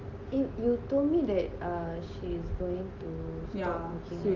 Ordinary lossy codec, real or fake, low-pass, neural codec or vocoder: Opus, 32 kbps; real; 7.2 kHz; none